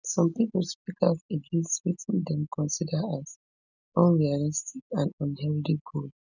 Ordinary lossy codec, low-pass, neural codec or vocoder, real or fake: none; 7.2 kHz; none; real